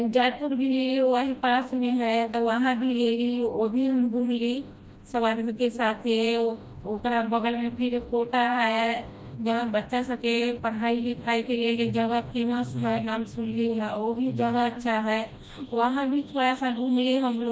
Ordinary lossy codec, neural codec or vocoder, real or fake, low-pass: none; codec, 16 kHz, 1 kbps, FreqCodec, smaller model; fake; none